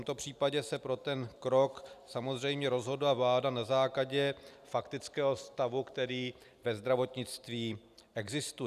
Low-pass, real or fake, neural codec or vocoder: 14.4 kHz; real; none